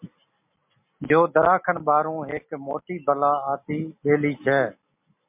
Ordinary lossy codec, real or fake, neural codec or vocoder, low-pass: MP3, 32 kbps; real; none; 3.6 kHz